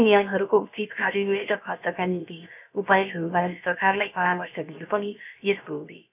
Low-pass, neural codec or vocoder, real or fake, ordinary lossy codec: 3.6 kHz; codec, 16 kHz, about 1 kbps, DyCAST, with the encoder's durations; fake; none